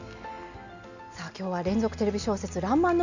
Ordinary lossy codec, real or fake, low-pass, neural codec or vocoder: none; real; 7.2 kHz; none